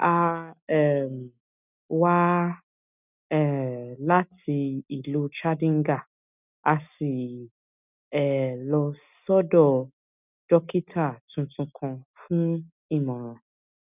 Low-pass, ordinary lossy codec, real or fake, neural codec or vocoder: 3.6 kHz; none; real; none